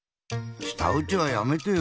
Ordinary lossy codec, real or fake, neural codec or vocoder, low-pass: none; real; none; none